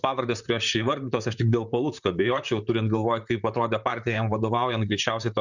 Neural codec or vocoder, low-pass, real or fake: vocoder, 44.1 kHz, 128 mel bands, Pupu-Vocoder; 7.2 kHz; fake